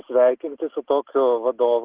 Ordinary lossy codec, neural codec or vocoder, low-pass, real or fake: Opus, 16 kbps; none; 3.6 kHz; real